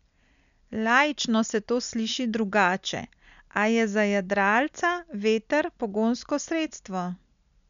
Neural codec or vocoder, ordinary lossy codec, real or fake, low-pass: none; none; real; 7.2 kHz